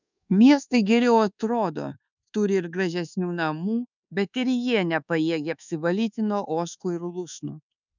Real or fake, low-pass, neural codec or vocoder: fake; 7.2 kHz; codec, 24 kHz, 1.2 kbps, DualCodec